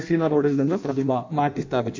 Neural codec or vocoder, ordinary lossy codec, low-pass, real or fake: codec, 16 kHz in and 24 kHz out, 0.6 kbps, FireRedTTS-2 codec; MP3, 64 kbps; 7.2 kHz; fake